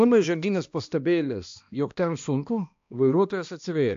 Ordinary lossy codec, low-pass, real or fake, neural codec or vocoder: AAC, 64 kbps; 7.2 kHz; fake; codec, 16 kHz, 1 kbps, X-Codec, HuBERT features, trained on balanced general audio